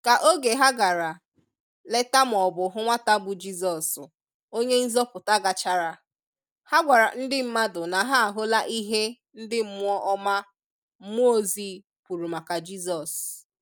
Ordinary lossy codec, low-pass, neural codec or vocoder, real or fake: none; none; none; real